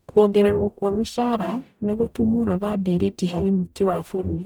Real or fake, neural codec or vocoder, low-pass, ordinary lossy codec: fake; codec, 44.1 kHz, 0.9 kbps, DAC; none; none